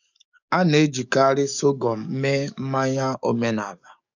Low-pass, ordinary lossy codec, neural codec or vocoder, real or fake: 7.2 kHz; none; codec, 44.1 kHz, 7.8 kbps, DAC; fake